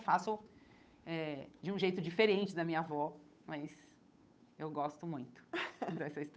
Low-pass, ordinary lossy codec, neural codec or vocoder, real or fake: none; none; codec, 16 kHz, 8 kbps, FunCodec, trained on Chinese and English, 25 frames a second; fake